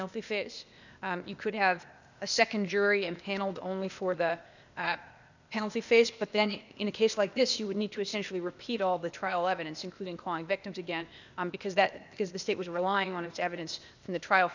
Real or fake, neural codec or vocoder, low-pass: fake; codec, 16 kHz, 0.8 kbps, ZipCodec; 7.2 kHz